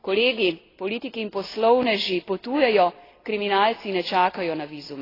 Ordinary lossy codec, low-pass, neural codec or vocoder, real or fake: AAC, 24 kbps; 5.4 kHz; none; real